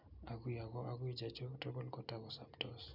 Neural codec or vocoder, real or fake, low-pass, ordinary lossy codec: none; real; 5.4 kHz; none